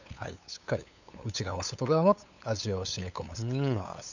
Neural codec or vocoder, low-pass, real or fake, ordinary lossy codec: codec, 16 kHz, 8 kbps, FunCodec, trained on LibriTTS, 25 frames a second; 7.2 kHz; fake; none